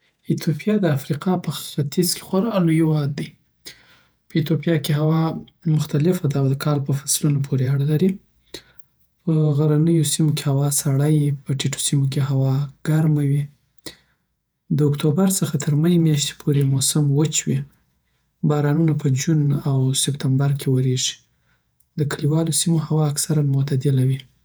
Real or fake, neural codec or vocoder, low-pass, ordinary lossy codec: fake; vocoder, 48 kHz, 128 mel bands, Vocos; none; none